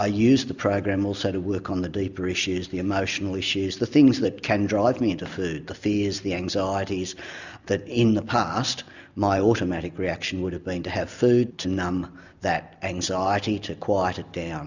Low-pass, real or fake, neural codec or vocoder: 7.2 kHz; real; none